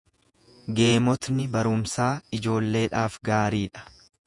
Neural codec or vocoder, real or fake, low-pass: vocoder, 48 kHz, 128 mel bands, Vocos; fake; 10.8 kHz